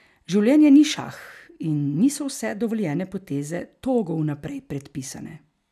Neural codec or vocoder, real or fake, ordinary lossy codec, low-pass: none; real; none; 14.4 kHz